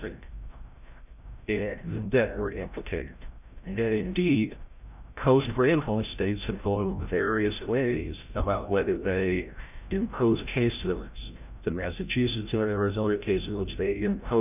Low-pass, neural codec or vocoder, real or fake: 3.6 kHz; codec, 16 kHz, 0.5 kbps, FreqCodec, larger model; fake